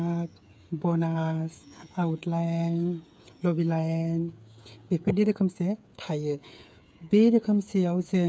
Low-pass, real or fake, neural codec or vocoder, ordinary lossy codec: none; fake; codec, 16 kHz, 8 kbps, FreqCodec, smaller model; none